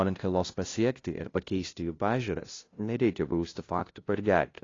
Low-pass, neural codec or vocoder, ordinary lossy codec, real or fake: 7.2 kHz; codec, 16 kHz, 0.5 kbps, FunCodec, trained on LibriTTS, 25 frames a second; AAC, 32 kbps; fake